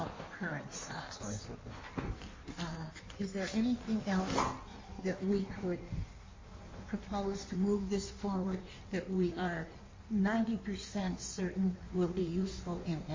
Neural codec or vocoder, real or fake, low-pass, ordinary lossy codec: codec, 16 kHz in and 24 kHz out, 1.1 kbps, FireRedTTS-2 codec; fake; 7.2 kHz; MP3, 32 kbps